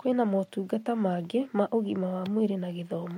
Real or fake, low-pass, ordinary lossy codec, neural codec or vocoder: real; 19.8 kHz; MP3, 64 kbps; none